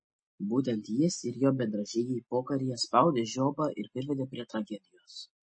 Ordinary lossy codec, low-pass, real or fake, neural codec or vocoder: MP3, 32 kbps; 9.9 kHz; real; none